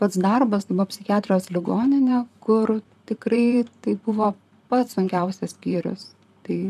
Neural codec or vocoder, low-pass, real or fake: vocoder, 44.1 kHz, 128 mel bands, Pupu-Vocoder; 14.4 kHz; fake